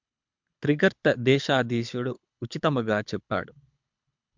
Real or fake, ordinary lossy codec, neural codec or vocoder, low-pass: fake; MP3, 64 kbps; codec, 24 kHz, 6 kbps, HILCodec; 7.2 kHz